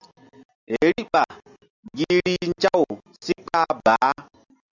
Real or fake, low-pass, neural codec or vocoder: real; 7.2 kHz; none